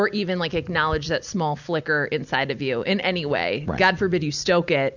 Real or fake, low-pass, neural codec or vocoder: real; 7.2 kHz; none